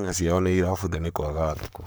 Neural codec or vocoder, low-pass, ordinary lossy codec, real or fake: codec, 44.1 kHz, 7.8 kbps, Pupu-Codec; none; none; fake